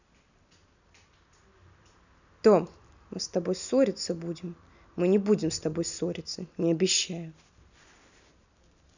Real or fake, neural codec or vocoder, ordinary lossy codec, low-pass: real; none; none; 7.2 kHz